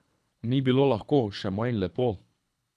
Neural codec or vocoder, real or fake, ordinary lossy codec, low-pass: codec, 24 kHz, 3 kbps, HILCodec; fake; none; none